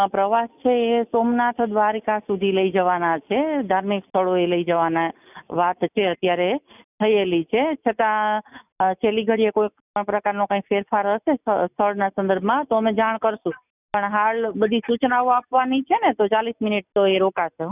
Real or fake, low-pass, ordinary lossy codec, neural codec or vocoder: real; 3.6 kHz; none; none